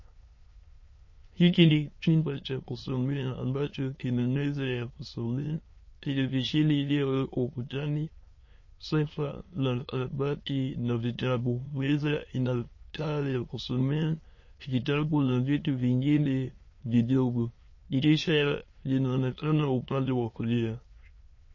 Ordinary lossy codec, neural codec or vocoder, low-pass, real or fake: MP3, 32 kbps; autoencoder, 22.05 kHz, a latent of 192 numbers a frame, VITS, trained on many speakers; 7.2 kHz; fake